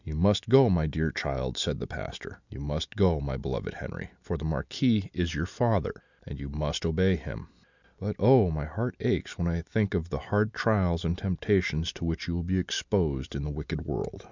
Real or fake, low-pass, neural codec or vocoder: real; 7.2 kHz; none